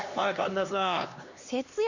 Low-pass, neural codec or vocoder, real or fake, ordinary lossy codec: 7.2 kHz; codec, 16 kHz, 2 kbps, X-Codec, HuBERT features, trained on LibriSpeech; fake; none